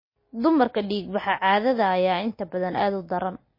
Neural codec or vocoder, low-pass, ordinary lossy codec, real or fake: none; 5.4 kHz; MP3, 24 kbps; real